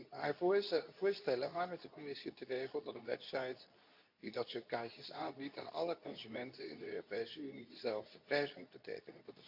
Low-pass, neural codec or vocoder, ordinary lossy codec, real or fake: 5.4 kHz; codec, 24 kHz, 0.9 kbps, WavTokenizer, medium speech release version 2; none; fake